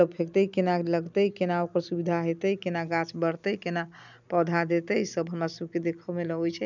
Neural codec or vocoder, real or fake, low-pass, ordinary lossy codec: none; real; 7.2 kHz; none